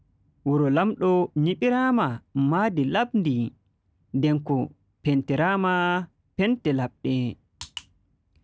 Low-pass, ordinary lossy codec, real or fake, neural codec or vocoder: none; none; real; none